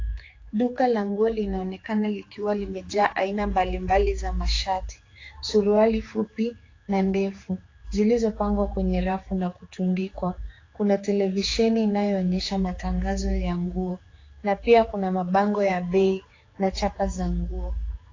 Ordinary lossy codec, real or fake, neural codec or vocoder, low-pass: AAC, 32 kbps; fake; codec, 16 kHz, 4 kbps, X-Codec, HuBERT features, trained on general audio; 7.2 kHz